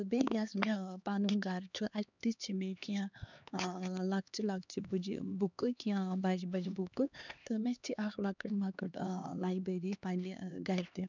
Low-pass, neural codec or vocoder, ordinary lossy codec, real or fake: 7.2 kHz; codec, 16 kHz, 2 kbps, X-Codec, HuBERT features, trained on LibriSpeech; none; fake